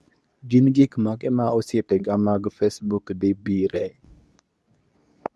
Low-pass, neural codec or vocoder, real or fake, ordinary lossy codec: none; codec, 24 kHz, 0.9 kbps, WavTokenizer, medium speech release version 2; fake; none